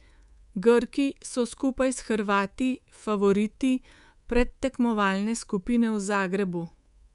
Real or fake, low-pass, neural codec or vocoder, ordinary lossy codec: fake; 10.8 kHz; codec, 24 kHz, 3.1 kbps, DualCodec; none